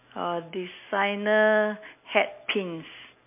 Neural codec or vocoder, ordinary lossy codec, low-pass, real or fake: none; MP3, 24 kbps; 3.6 kHz; real